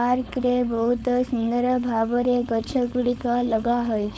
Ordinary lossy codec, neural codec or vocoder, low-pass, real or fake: none; codec, 16 kHz, 4.8 kbps, FACodec; none; fake